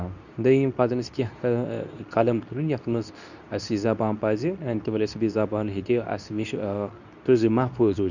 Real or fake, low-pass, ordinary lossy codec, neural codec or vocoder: fake; 7.2 kHz; none; codec, 24 kHz, 0.9 kbps, WavTokenizer, medium speech release version 2